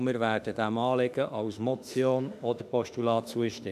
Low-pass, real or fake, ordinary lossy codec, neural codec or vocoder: 14.4 kHz; fake; none; autoencoder, 48 kHz, 32 numbers a frame, DAC-VAE, trained on Japanese speech